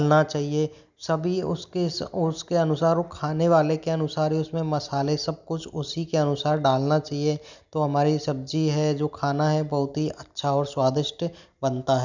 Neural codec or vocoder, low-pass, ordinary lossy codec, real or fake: none; 7.2 kHz; none; real